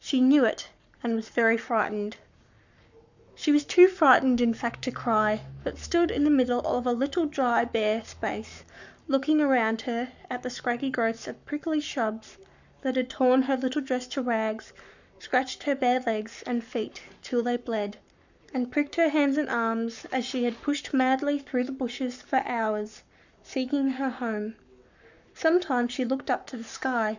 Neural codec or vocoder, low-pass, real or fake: codec, 44.1 kHz, 7.8 kbps, Pupu-Codec; 7.2 kHz; fake